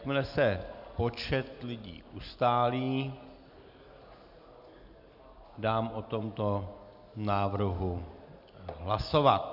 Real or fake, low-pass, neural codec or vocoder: real; 5.4 kHz; none